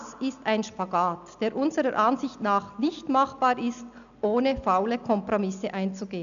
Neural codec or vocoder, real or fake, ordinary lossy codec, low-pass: none; real; none; 7.2 kHz